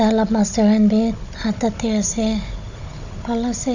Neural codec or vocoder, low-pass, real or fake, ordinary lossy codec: codec, 16 kHz, 16 kbps, FunCodec, trained on Chinese and English, 50 frames a second; 7.2 kHz; fake; none